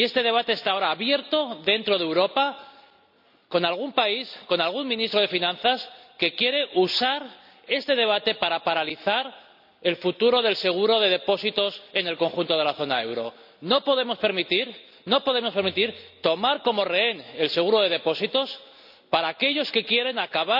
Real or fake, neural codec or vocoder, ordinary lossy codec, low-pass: real; none; none; 5.4 kHz